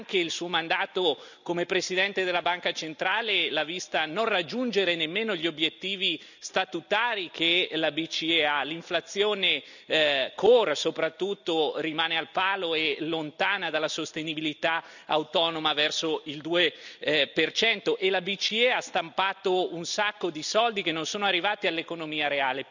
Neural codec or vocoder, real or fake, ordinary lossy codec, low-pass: none; real; none; 7.2 kHz